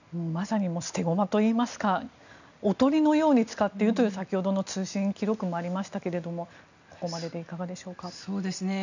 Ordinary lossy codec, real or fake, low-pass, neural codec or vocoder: none; real; 7.2 kHz; none